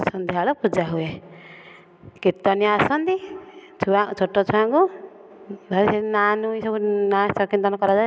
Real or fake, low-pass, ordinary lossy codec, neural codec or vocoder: real; none; none; none